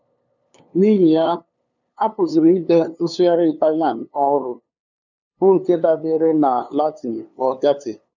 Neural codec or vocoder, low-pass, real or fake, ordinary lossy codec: codec, 16 kHz, 2 kbps, FunCodec, trained on LibriTTS, 25 frames a second; 7.2 kHz; fake; none